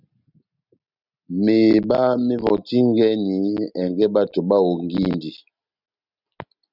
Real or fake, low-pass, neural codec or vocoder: real; 5.4 kHz; none